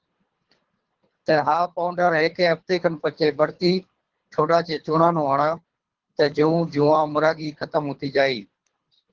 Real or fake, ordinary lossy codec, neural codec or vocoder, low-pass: fake; Opus, 16 kbps; codec, 24 kHz, 3 kbps, HILCodec; 7.2 kHz